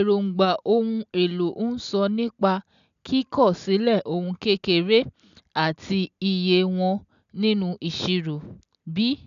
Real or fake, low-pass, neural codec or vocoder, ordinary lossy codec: real; 7.2 kHz; none; none